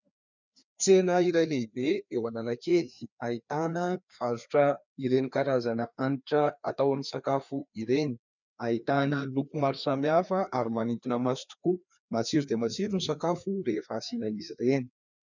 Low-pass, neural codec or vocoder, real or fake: 7.2 kHz; codec, 16 kHz, 2 kbps, FreqCodec, larger model; fake